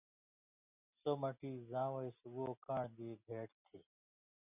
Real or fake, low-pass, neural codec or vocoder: real; 3.6 kHz; none